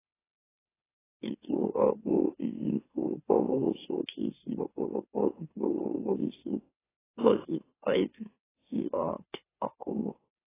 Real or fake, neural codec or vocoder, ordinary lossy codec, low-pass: fake; autoencoder, 44.1 kHz, a latent of 192 numbers a frame, MeloTTS; AAC, 16 kbps; 3.6 kHz